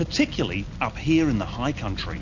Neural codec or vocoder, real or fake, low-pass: none; real; 7.2 kHz